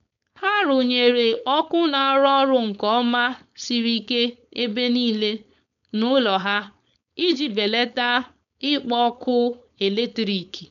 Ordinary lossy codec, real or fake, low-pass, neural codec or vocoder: none; fake; 7.2 kHz; codec, 16 kHz, 4.8 kbps, FACodec